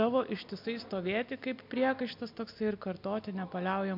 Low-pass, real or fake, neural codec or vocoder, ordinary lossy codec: 5.4 kHz; real; none; MP3, 48 kbps